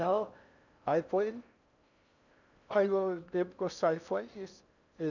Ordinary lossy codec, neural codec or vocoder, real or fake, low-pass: none; codec, 16 kHz in and 24 kHz out, 0.6 kbps, FocalCodec, streaming, 4096 codes; fake; 7.2 kHz